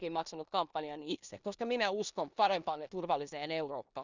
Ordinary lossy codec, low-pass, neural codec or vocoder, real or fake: none; 7.2 kHz; codec, 16 kHz in and 24 kHz out, 0.9 kbps, LongCat-Audio-Codec, four codebook decoder; fake